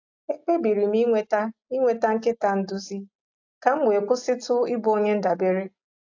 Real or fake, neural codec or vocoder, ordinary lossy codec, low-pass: real; none; AAC, 48 kbps; 7.2 kHz